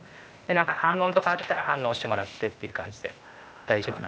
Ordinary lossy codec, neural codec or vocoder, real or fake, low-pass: none; codec, 16 kHz, 0.8 kbps, ZipCodec; fake; none